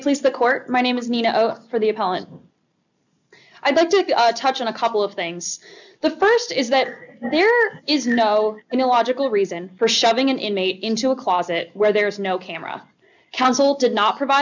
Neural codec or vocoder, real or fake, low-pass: none; real; 7.2 kHz